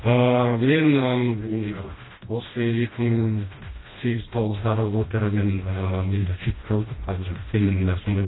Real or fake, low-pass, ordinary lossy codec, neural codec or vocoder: fake; 7.2 kHz; AAC, 16 kbps; codec, 16 kHz, 1 kbps, FreqCodec, smaller model